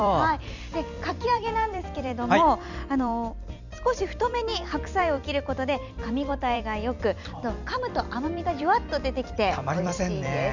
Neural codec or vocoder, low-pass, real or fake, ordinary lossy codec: vocoder, 44.1 kHz, 128 mel bands every 256 samples, BigVGAN v2; 7.2 kHz; fake; none